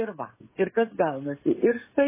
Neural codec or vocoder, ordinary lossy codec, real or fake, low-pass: codec, 16 kHz, 16 kbps, FreqCodec, smaller model; MP3, 16 kbps; fake; 3.6 kHz